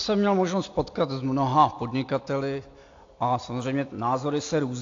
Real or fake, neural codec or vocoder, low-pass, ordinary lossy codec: real; none; 7.2 kHz; AAC, 48 kbps